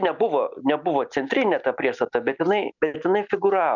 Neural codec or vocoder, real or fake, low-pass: none; real; 7.2 kHz